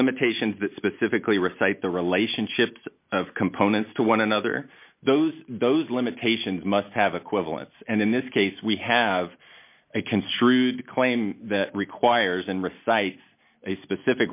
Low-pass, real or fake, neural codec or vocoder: 3.6 kHz; real; none